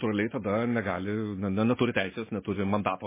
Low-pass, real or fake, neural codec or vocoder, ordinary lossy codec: 3.6 kHz; real; none; MP3, 16 kbps